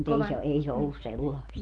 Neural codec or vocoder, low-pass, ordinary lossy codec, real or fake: none; 9.9 kHz; Opus, 24 kbps; real